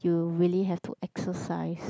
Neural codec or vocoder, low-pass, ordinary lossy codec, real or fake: none; none; none; real